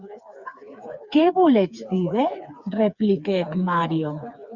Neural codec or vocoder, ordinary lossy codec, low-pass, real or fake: codec, 16 kHz, 4 kbps, FreqCodec, smaller model; Opus, 64 kbps; 7.2 kHz; fake